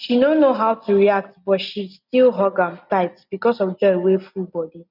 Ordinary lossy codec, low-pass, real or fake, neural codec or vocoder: none; 5.4 kHz; real; none